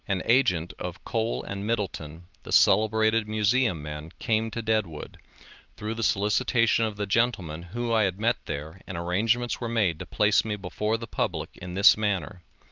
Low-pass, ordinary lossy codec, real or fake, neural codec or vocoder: 7.2 kHz; Opus, 24 kbps; real; none